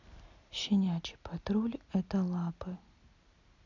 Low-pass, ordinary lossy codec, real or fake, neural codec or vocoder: 7.2 kHz; none; real; none